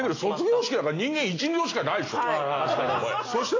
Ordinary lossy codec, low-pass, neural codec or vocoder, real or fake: AAC, 32 kbps; 7.2 kHz; none; real